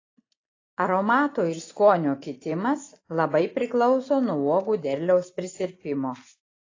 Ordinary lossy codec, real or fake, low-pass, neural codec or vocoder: AAC, 32 kbps; real; 7.2 kHz; none